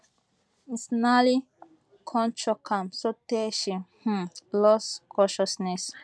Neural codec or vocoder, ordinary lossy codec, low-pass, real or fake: none; none; none; real